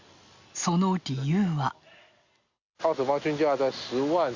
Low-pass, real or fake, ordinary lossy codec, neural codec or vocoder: 7.2 kHz; real; Opus, 64 kbps; none